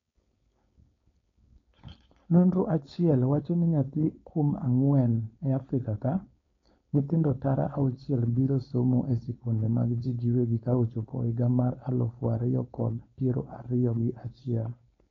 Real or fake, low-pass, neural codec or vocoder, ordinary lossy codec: fake; 7.2 kHz; codec, 16 kHz, 4.8 kbps, FACodec; AAC, 32 kbps